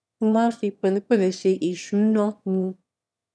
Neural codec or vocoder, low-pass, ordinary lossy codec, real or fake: autoencoder, 22.05 kHz, a latent of 192 numbers a frame, VITS, trained on one speaker; none; none; fake